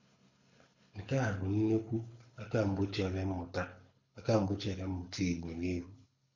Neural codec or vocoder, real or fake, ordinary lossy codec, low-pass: codec, 24 kHz, 6 kbps, HILCodec; fake; none; 7.2 kHz